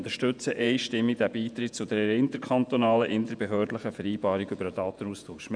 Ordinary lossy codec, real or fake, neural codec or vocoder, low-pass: none; fake; vocoder, 48 kHz, 128 mel bands, Vocos; 9.9 kHz